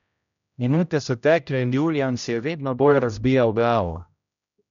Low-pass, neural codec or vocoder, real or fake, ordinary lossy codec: 7.2 kHz; codec, 16 kHz, 0.5 kbps, X-Codec, HuBERT features, trained on general audio; fake; none